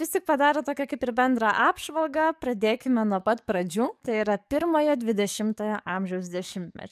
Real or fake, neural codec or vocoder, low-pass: fake; codec, 44.1 kHz, 7.8 kbps, DAC; 14.4 kHz